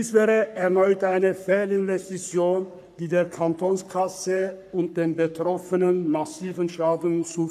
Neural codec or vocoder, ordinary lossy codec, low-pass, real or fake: codec, 44.1 kHz, 3.4 kbps, Pupu-Codec; none; 14.4 kHz; fake